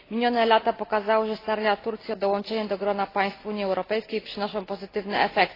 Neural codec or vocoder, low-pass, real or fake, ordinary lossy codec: none; 5.4 kHz; real; AAC, 24 kbps